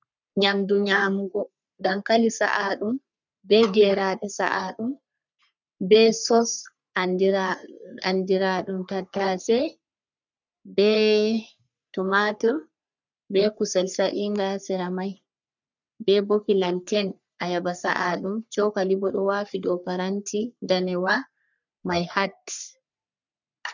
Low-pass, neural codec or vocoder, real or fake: 7.2 kHz; codec, 44.1 kHz, 3.4 kbps, Pupu-Codec; fake